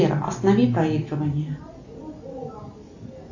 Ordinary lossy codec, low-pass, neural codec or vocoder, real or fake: AAC, 48 kbps; 7.2 kHz; none; real